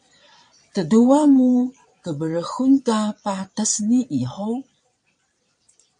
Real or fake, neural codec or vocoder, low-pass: fake; vocoder, 22.05 kHz, 80 mel bands, Vocos; 9.9 kHz